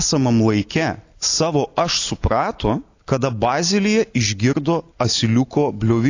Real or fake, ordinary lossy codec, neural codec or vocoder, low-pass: real; AAC, 48 kbps; none; 7.2 kHz